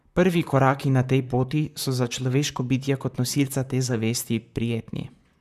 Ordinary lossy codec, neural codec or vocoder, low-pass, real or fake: none; vocoder, 44.1 kHz, 128 mel bands, Pupu-Vocoder; 14.4 kHz; fake